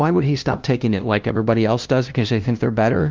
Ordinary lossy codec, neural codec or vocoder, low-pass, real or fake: Opus, 32 kbps; codec, 16 kHz, 0.5 kbps, FunCodec, trained on LibriTTS, 25 frames a second; 7.2 kHz; fake